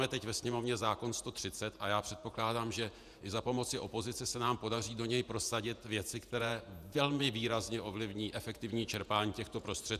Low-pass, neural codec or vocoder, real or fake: 14.4 kHz; vocoder, 48 kHz, 128 mel bands, Vocos; fake